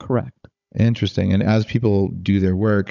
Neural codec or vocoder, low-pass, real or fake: codec, 16 kHz, 16 kbps, FunCodec, trained on Chinese and English, 50 frames a second; 7.2 kHz; fake